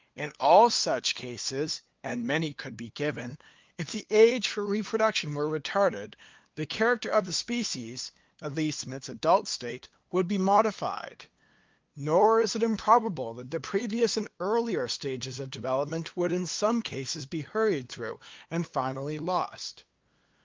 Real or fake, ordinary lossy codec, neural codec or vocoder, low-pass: fake; Opus, 32 kbps; codec, 16 kHz, 2 kbps, FunCodec, trained on LibriTTS, 25 frames a second; 7.2 kHz